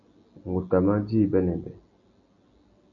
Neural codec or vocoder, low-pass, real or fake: none; 7.2 kHz; real